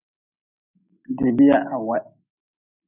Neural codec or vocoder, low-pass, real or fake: none; 3.6 kHz; real